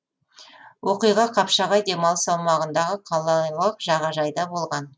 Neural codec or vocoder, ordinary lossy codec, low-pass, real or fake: none; none; none; real